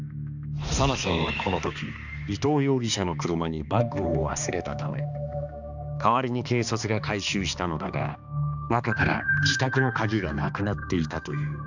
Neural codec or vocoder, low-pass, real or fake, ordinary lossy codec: codec, 16 kHz, 2 kbps, X-Codec, HuBERT features, trained on balanced general audio; 7.2 kHz; fake; none